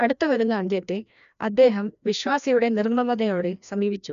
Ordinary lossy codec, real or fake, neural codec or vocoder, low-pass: none; fake; codec, 16 kHz, 1 kbps, FreqCodec, larger model; 7.2 kHz